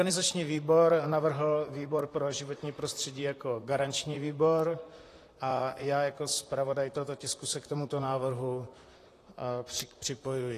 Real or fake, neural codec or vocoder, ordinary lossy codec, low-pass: fake; vocoder, 44.1 kHz, 128 mel bands, Pupu-Vocoder; AAC, 48 kbps; 14.4 kHz